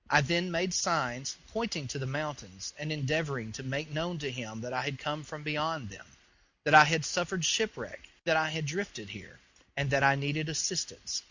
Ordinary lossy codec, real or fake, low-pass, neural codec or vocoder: Opus, 64 kbps; real; 7.2 kHz; none